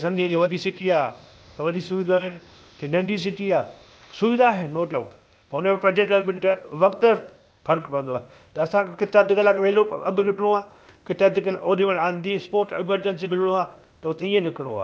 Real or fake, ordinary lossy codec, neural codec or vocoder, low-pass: fake; none; codec, 16 kHz, 0.8 kbps, ZipCodec; none